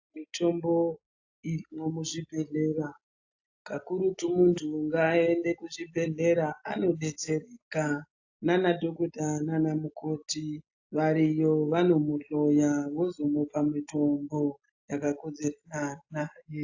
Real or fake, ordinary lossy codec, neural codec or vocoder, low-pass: real; AAC, 48 kbps; none; 7.2 kHz